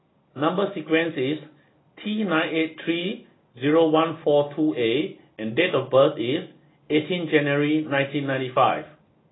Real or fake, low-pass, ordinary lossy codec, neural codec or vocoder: real; 7.2 kHz; AAC, 16 kbps; none